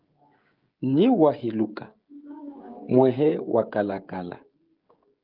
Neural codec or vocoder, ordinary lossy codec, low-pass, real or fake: codec, 16 kHz, 16 kbps, FreqCodec, smaller model; Opus, 24 kbps; 5.4 kHz; fake